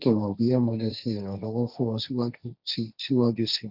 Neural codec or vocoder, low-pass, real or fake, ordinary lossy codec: codec, 16 kHz, 1.1 kbps, Voila-Tokenizer; 5.4 kHz; fake; none